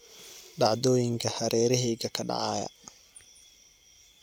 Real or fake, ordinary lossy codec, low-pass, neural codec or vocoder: real; none; 19.8 kHz; none